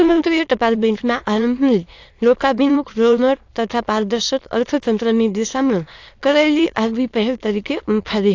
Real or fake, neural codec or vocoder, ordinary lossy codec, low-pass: fake; autoencoder, 22.05 kHz, a latent of 192 numbers a frame, VITS, trained on many speakers; AAC, 48 kbps; 7.2 kHz